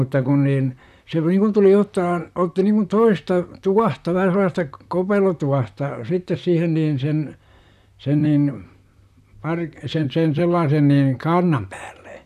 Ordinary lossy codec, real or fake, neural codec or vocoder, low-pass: none; fake; vocoder, 44.1 kHz, 128 mel bands, Pupu-Vocoder; 14.4 kHz